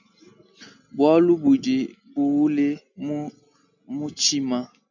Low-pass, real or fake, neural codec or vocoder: 7.2 kHz; real; none